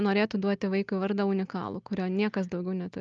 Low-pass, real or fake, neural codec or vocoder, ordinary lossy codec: 7.2 kHz; real; none; Opus, 32 kbps